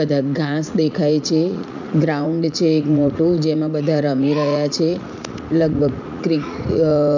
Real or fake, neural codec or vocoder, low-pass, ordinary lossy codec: fake; vocoder, 44.1 kHz, 80 mel bands, Vocos; 7.2 kHz; none